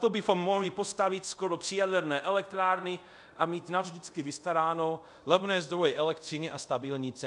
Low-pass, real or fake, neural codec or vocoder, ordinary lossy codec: 10.8 kHz; fake; codec, 24 kHz, 0.5 kbps, DualCodec; MP3, 96 kbps